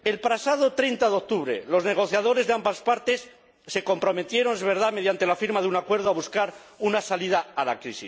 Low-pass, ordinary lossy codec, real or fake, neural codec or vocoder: none; none; real; none